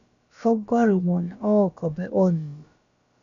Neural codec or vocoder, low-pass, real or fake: codec, 16 kHz, about 1 kbps, DyCAST, with the encoder's durations; 7.2 kHz; fake